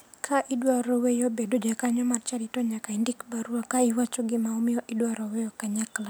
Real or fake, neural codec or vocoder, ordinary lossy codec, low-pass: real; none; none; none